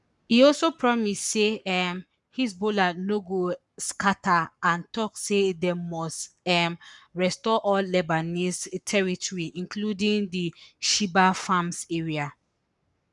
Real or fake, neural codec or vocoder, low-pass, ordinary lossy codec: fake; codec, 44.1 kHz, 7.8 kbps, Pupu-Codec; 10.8 kHz; none